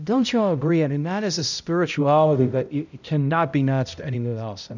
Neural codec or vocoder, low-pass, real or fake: codec, 16 kHz, 0.5 kbps, X-Codec, HuBERT features, trained on balanced general audio; 7.2 kHz; fake